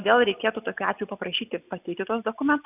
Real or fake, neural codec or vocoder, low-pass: real; none; 3.6 kHz